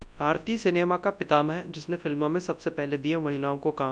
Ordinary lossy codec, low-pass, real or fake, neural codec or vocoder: MP3, 96 kbps; 9.9 kHz; fake; codec, 24 kHz, 0.9 kbps, WavTokenizer, large speech release